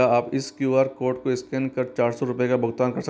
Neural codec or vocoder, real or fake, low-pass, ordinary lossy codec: none; real; none; none